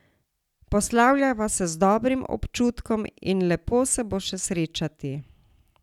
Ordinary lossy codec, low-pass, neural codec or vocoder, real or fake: none; 19.8 kHz; none; real